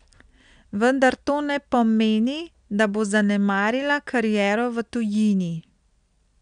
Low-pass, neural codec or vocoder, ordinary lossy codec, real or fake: 9.9 kHz; none; none; real